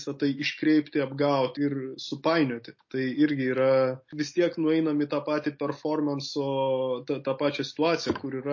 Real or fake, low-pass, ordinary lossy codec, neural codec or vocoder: real; 7.2 kHz; MP3, 32 kbps; none